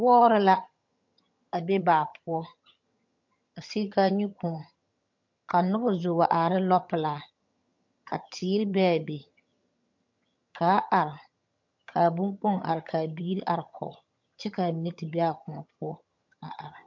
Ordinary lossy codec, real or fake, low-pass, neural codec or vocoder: MP3, 48 kbps; fake; 7.2 kHz; vocoder, 22.05 kHz, 80 mel bands, HiFi-GAN